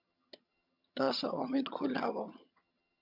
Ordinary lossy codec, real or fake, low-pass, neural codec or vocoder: MP3, 48 kbps; fake; 5.4 kHz; vocoder, 22.05 kHz, 80 mel bands, HiFi-GAN